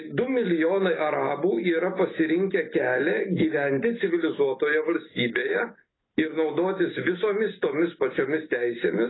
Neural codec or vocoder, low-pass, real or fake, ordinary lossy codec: none; 7.2 kHz; real; AAC, 16 kbps